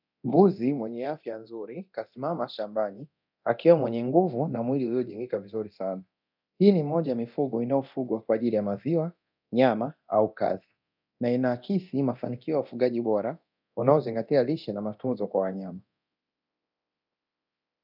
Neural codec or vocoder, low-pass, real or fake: codec, 24 kHz, 0.9 kbps, DualCodec; 5.4 kHz; fake